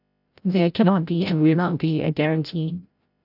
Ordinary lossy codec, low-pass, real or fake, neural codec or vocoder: none; 5.4 kHz; fake; codec, 16 kHz, 0.5 kbps, FreqCodec, larger model